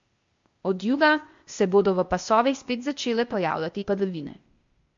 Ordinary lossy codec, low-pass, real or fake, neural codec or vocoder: MP3, 48 kbps; 7.2 kHz; fake; codec, 16 kHz, 0.8 kbps, ZipCodec